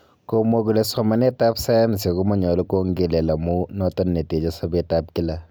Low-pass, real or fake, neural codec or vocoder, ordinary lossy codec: none; real; none; none